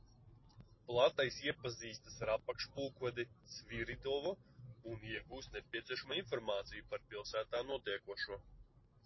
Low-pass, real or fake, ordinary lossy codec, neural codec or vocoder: 7.2 kHz; real; MP3, 24 kbps; none